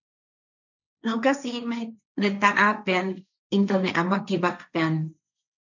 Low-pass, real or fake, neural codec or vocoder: 7.2 kHz; fake; codec, 16 kHz, 1.1 kbps, Voila-Tokenizer